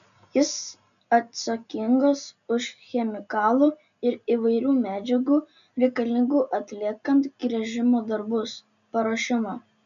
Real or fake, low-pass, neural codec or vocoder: real; 7.2 kHz; none